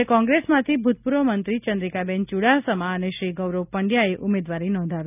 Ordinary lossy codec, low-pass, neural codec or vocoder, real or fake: none; 3.6 kHz; none; real